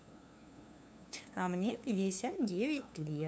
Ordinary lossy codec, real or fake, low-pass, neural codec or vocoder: none; fake; none; codec, 16 kHz, 2 kbps, FunCodec, trained on LibriTTS, 25 frames a second